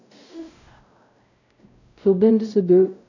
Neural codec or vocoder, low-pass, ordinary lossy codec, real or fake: codec, 16 kHz, 0.5 kbps, X-Codec, WavLM features, trained on Multilingual LibriSpeech; 7.2 kHz; none; fake